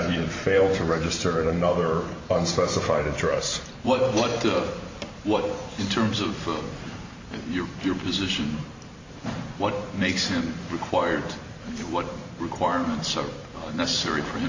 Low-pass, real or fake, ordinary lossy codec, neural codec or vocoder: 7.2 kHz; fake; MP3, 48 kbps; vocoder, 44.1 kHz, 128 mel bands every 512 samples, BigVGAN v2